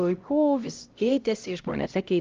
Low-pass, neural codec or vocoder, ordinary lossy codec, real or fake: 7.2 kHz; codec, 16 kHz, 0.5 kbps, X-Codec, HuBERT features, trained on LibriSpeech; Opus, 32 kbps; fake